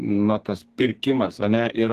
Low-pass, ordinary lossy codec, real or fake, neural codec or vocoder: 14.4 kHz; Opus, 16 kbps; fake; codec, 32 kHz, 1.9 kbps, SNAC